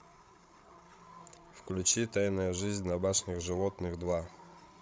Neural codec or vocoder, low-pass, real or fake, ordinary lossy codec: codec, 16 kHz, 16 kbps, FreqCodec, larger model; none; fake; none